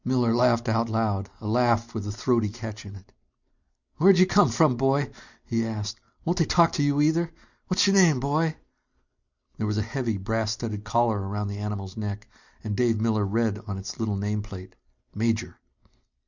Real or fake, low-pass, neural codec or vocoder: real; 7.2 kHz; none